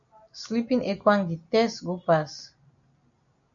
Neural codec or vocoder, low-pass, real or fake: none; 7.2 kHz; real